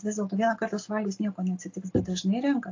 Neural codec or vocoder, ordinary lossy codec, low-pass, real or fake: none; AAC, 48 kbps; 7.2 kHz; real